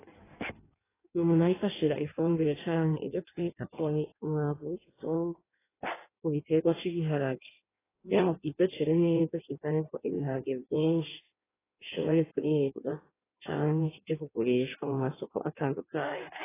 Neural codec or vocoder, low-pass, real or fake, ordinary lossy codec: codec, 16 kHz in and 24 kHz out, 1.1 kbps, FireRedTTS-2 codec; 3.6 kHz; fake; AAC, 16 kbps